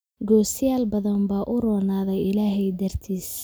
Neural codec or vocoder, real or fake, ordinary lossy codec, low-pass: none; real; none; none